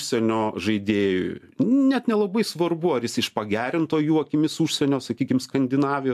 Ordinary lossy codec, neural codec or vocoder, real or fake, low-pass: AAC, 96 kbps; none; real; 14.4 kHz